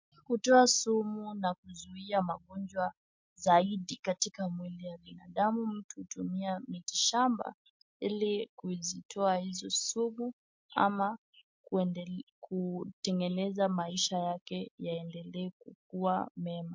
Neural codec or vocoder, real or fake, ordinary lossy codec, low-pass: none; real; MP3, 48 kbps; 7.2 kHz